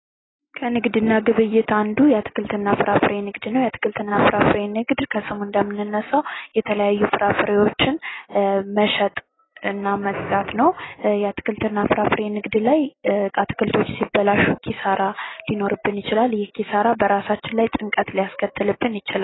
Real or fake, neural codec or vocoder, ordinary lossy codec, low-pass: real; none; AAC, 16 kbps; 7.2 kHz